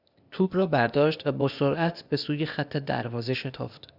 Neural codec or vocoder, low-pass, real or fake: codec, 16 kHz, 0.8 kbps, ZipCodec; 5.4 kHz; fake